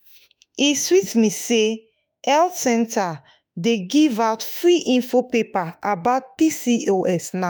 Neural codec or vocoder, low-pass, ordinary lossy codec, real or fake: autoencoder, 48 kHz, 32 numbers a frame, DAC-VAE, trained on Japanese speech; none; none; fake